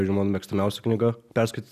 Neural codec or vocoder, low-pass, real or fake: none; 14.4 kHz; real